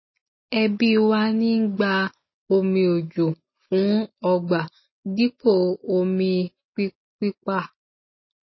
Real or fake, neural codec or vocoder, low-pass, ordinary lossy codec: real; none; 7.2 kHz; MP3, 24 kbps